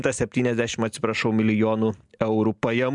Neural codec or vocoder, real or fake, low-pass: none; real; 10.8 kHz